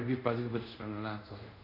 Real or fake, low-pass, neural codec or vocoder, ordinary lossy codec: fake; 5.4 kHz; codec, 24 kHz, 0.5 kbps, DualCodec; none